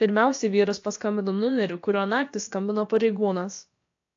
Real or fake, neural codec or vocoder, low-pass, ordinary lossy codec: fake; codec, 16 kHz, about 1 kbps, DyCAST, with the encoder's durations; 7.2 kHz; AAC, 48 kbps